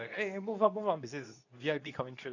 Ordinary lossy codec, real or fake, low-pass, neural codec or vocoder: none; fake; 7.2 kHz; codec, 24 kHz, 0.9 kbps, WavTokenizer, medium speech release version 2